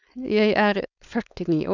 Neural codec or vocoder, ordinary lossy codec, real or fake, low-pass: codec, 16 kHz, 4.8 kbps, FACodec; none; fake; 7.2 kHz